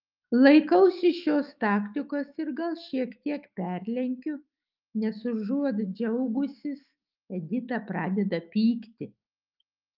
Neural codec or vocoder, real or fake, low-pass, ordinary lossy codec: autoencoder, 48 kHz, 128 numbers a frame, DAC-VAE, trained on Japanese speech; fake; 5.4 kHz; Opus, 24 kbps